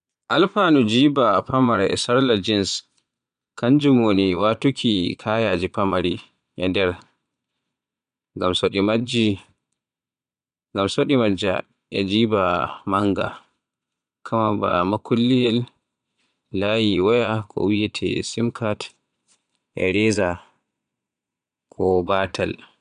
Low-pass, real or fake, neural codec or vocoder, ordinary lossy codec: 9.9 kHz; fake; vocoder, 22.05 kHz, 80 mel bands, Vocos; none